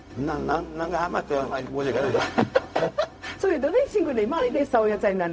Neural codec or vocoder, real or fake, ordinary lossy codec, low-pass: codec, 16 kHz, 0.4 kbps, LongCat-Audio-Codec; fake; none; none